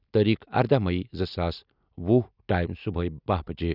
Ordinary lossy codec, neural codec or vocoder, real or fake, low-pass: none; none; real; 5.4 kHz